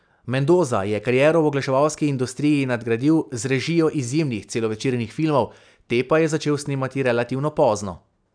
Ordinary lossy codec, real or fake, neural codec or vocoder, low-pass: none; real; none; 9.9 kHz